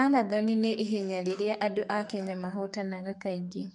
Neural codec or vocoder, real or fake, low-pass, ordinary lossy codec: codec, 44.1 kHz, 2.6 kbps, SNAC; fake; 10.8 kHz; MP3, 64 kbps